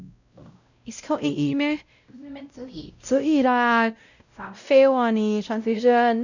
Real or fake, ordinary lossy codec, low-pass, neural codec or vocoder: fake; none; 7.2 kHz; codec, 16 kHz, 0.5 kbps, X-Codec, WavLM features, trained on Multilingual LibriSpeech